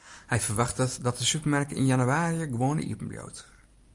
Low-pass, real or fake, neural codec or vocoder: 10.8 kHz; real; none